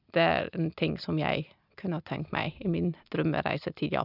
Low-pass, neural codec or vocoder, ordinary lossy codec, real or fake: 5.4 kHz; none; none; real